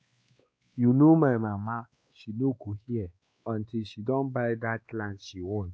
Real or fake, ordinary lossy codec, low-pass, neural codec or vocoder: fake; none; none; codec, 16 kHz, 2 kbps, X-Codec, WavLM features, trained on Multilingual LibriSpeech